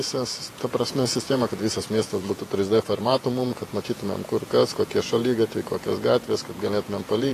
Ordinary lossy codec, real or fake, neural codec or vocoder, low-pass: AAC, 48 kbps; fake; vocoder, 48 kHz, 128 mel bands, Vocos; 14.4 kHz